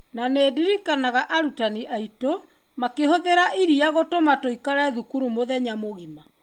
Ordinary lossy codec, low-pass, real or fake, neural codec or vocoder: Opus, 32 kbps; 19.8 kHz; real; none